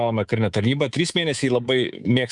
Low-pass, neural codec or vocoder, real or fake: 10.8 kHz; codec, 24 kHz, 3.1 kbps, DualCodec; fake